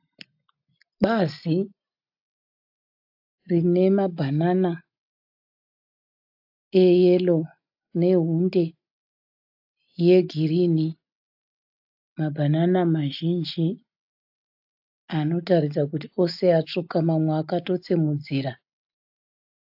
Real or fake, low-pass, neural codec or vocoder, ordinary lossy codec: real; 5.4 kHz; none; AAC, 48 kbps